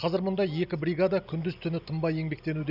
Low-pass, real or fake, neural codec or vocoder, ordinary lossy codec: 5.4 kHz; real; none; none